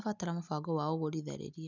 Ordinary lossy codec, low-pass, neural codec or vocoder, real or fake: none; 7.2 kHz; none; real